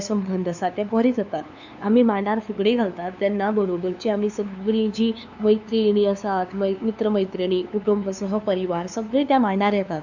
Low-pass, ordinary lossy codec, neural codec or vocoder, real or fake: 7.2 kHz; none; codec, 16 kHz, 2 kbps, FunCodec, trained on LibriTTS, 25 frames a second; fake